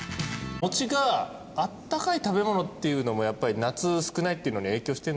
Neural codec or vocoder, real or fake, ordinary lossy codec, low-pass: none; real; none; none